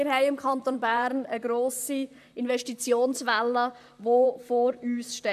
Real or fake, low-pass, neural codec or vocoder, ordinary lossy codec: fake; 14.4 kHz; vocoder, 44.1 kHz, 128 mel bands, Pupu-Vocoder; AAC, 96 kbps